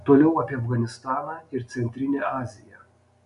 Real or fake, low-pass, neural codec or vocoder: real; 10.8 kHz; none